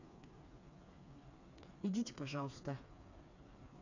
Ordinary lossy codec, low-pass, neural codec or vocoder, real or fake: none; 7.2 kHz; codec, 16 kHz, 4 kbps, FreqCodec, smaller model; fake